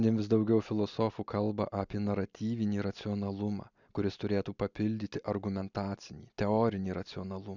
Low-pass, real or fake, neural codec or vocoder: 7.2 kHz; real; none